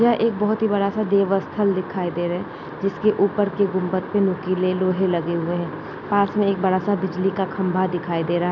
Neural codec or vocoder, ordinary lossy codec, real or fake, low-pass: none; none; real; 7.2 kHz